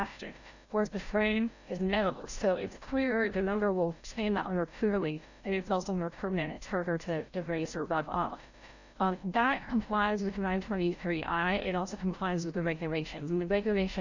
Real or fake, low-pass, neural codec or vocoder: fake; 7.2 kHz; codec, 16 kHz, 0.5 kbps, FreqCodec, larger model